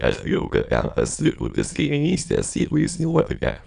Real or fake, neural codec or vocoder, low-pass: fake; autoencoder, 22.05 kHz, a latent of 192 numbers a frame, VITS, trained on many speakers; 9.9 kHz